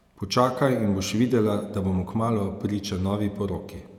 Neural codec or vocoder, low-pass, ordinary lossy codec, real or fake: vocoder, 48 kHz, 128 mel bands, Vocos; 19.8 kHz; none; fake